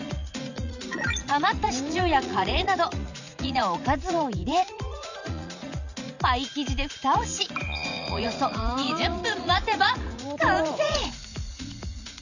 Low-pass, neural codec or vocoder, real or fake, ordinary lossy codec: 7.2 kHz; vocoder, 44.1 kHz, 80 mel bands, Vocos; fake; none